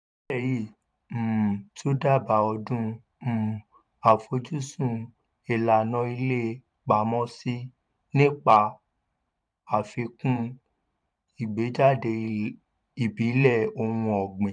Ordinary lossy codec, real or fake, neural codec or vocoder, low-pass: none; fake; vocoder, 44.1 kHz, 128 mel bands every 512 samples, BigVGAN v2; 9.9 kHz